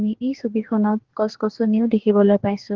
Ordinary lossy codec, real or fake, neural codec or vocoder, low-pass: Opus, 16 kbps; fake; codec, 44.1 kHz, 2.6 kbps, DAC; 7.2 kHz